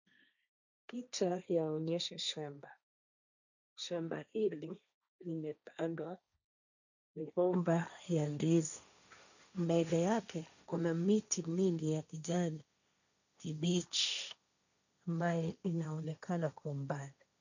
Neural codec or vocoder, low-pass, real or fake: codec, 16 kHz, 1.1 kbps, Voila-Tokenizer; 7.2 kHz; fake